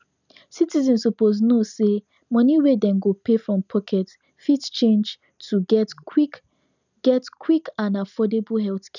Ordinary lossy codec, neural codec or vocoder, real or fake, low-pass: none; none; real; 7.2 kHz